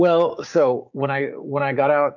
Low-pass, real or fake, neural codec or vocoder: 7.2 kHz; fake; codec, 16 kHz, 6 kbps, DAC